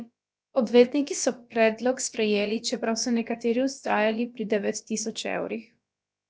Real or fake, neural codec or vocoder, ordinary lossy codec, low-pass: fake; codec, 16 kHz, about 1 kbps, DyCAST, with the encoder's durations; none; none